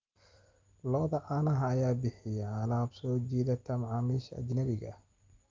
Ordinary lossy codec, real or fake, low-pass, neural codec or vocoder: Opus, 32 kbps; real; 7.2 kHz; none